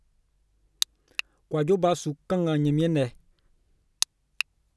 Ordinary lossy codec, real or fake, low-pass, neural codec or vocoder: none; real; none; none